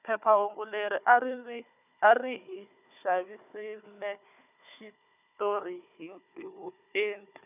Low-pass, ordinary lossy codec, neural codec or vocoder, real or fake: 3.6 kHz; none; codec, 16 kHz, 4 kbps, FunCodec, trained on Chinese and English, 50 frames a second; fake